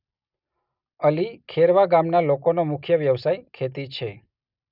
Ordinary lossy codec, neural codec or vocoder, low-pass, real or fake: none; none; 5.4 kHz; real